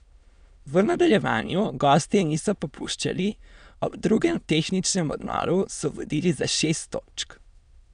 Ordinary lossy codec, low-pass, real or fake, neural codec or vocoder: none; 9.9 kHz; fake; autoencoder, 22.05 kHz, a latent of 192 numbers a frame, VITS, trained on many speakers